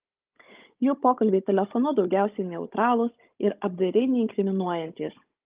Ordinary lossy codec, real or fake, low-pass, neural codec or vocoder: Opus, 32 kbps; fake; 3.6 kHz; codec, 16 kHz, 16 kbps, FunCodec, trained on Chinese and English, 50 frames a second